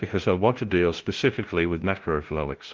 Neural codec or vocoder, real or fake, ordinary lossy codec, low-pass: codec, 16 kHz, 0.5 kbps, FunCodec, trained on LibriTTS, 25 frames a second; fake; Opus, 16 kbps; 7.2 kHz